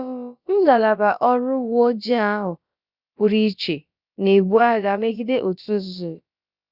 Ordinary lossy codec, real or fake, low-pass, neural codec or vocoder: Opus, 64 kbps; fake; 5.4 kHz; codec, 16 kHz, about 1 kbps, DyCAST, with the encoder's durations